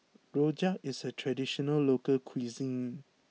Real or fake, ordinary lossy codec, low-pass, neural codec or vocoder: real; none; none; none